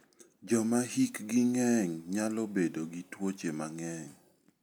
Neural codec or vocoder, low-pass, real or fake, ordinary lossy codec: none; none; real; none